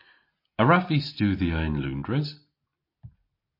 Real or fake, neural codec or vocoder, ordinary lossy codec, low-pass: real; none; MP3, 32 kbps; 5.4 kHz